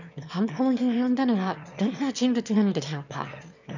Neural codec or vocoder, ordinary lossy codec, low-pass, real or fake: autoencoder, 22.05 kHz, a latent of 192 numbers a frame, VITS, trained on one speaker; none; 7.2 kHz; fake